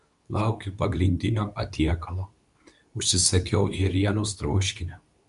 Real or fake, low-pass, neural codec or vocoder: fake; 10.8 kHz; codec, 24 kHz, 0.9 kbps, WavTokenizer, medium speech release version 2